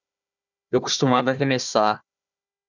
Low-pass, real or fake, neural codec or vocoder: 7.2 kHz; fake; codec, 16 kHz, 1 kbps, FunCodec, trained on Chinese and English, 50 frames a second